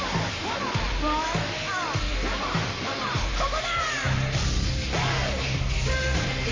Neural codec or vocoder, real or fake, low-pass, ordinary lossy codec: codec, 44.1 kHz, 7.8 kbps, Pupu-Codec; fake; 7.2 kHz; MP3, 48 kbps